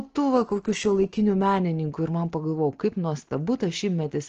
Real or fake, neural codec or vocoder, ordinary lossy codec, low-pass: real; none; Opus, 16 kbps; 7.2 kHz